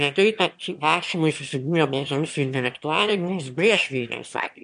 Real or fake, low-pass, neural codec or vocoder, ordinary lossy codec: fake; 9.9 kHz; autoencoder, 22.05 kHz, a latent of 192 numbers a frame, VITS, trained on one speaker; MP3, 64 kbps